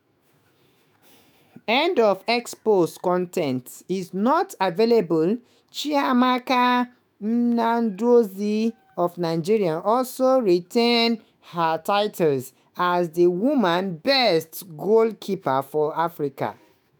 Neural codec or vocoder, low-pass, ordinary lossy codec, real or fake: autoencoder, 48 kHz, 128 numbers a frame, DAC-VAE, trained on Japanese speech; none; none; fake